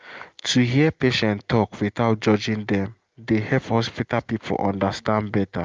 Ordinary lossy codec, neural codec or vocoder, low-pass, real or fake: Opus, 24 kbps; none; 7.2 kHz; real